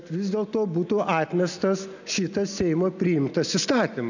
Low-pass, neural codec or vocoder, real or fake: 7.2 kHz; none; real